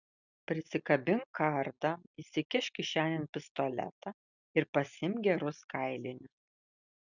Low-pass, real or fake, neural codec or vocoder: 7.2 kHz; real; none